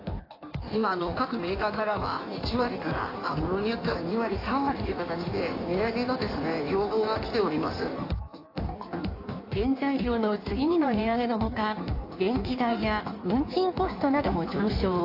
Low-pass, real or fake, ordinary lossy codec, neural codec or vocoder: 5.4 kHz; fake; AAC, 32 kbps; codec, 16 kHz in and 24 kHz out, 1.1 kbps, FireRedTTS-2 codec